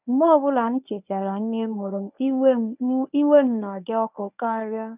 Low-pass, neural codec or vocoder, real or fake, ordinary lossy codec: 3.6 kHz; codec, 24 kHz, 0.9 kbps, WavTokenizer, medium speech release version 1; fake; none